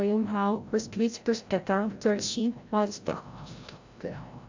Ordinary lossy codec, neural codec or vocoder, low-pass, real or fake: AAC, 48 kbps; codec, 16 kHz, 0.5 kbps, FreqCodec, larger model; 7.2 kHz; fake